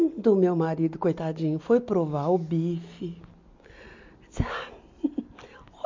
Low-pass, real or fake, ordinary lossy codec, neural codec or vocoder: 7.2 kHz; real; MP3, 48 kbps; none